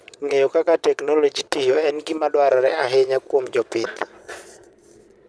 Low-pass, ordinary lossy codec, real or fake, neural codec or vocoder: none; none; fake; vocoder, 22.05 kHz, 80 mel bands, Vocos